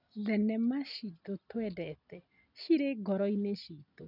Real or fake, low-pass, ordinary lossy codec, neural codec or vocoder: real; 5.4 kHz; none; none